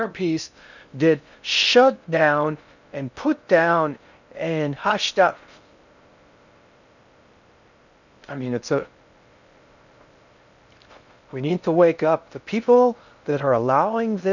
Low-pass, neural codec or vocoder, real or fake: 7.2 kHz; codec, 16 kHz in and 24 kHz out, 0.6 kbps, FocalCodec, streaming, 4096 codes; fake